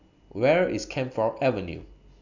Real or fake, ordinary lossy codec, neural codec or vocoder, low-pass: real; none; none; 7.2 kHz